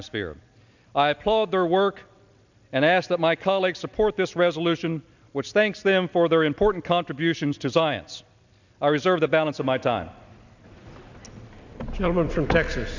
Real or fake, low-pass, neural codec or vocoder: real; 7.2 kHz; none